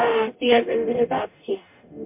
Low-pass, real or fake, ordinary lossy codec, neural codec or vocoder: 3.6 kHz; fake; MP3, 32 kbps; codec, 44.1 kHz, 0.9 kbps, DAC